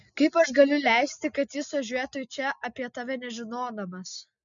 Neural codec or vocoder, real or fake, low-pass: none; real; 7.2 kHz